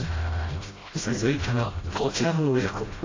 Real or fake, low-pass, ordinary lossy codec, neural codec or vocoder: fake; 7.2 kHz; AAC, 32 kbps; codec, 16 kHz, 0.5 kbps, FreqCodec, smaller model